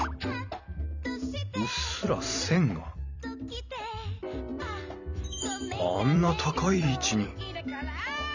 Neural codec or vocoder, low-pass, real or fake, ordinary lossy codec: vocoder, 44.1 kHz, 128 mel bands every 256 samples, BigVGAN v2; 7.2 kHz; fake; none